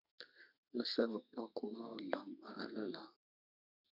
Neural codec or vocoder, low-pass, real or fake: codec, 32 kHz, 1.9 kbps, SNAC; 5.4 kHz; fake